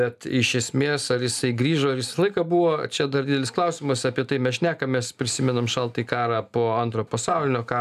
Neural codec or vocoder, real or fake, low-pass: none; real; 14.4 kHz